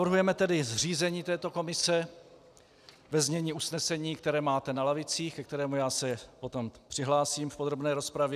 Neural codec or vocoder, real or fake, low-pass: none; real; 14.4 kHz